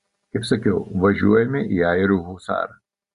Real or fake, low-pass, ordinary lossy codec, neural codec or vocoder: real; 10.8 kHz; Opus, 64 kbps; none